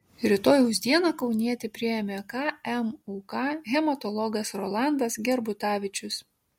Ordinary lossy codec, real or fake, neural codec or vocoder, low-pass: MP3, 64 kbps; real; none; 19.8 kHz